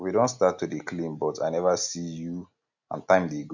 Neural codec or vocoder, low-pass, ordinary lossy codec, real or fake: none; 7.2 kHz; none; real